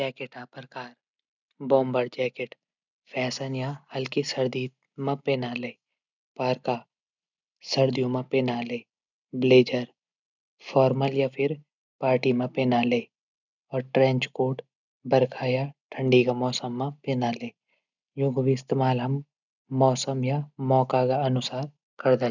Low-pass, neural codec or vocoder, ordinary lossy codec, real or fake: 7.2 kHz; none; none; real